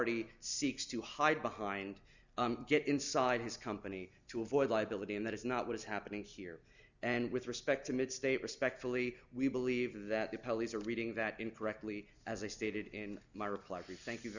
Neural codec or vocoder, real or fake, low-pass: none; real; 7.2 kHz